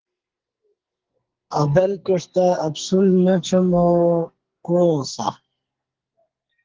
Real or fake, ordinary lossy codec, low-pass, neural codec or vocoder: fake; Opus, 16 kbps; 7.2 kHz; codec, 44.1 kHz, 2.6 kbps, SNAC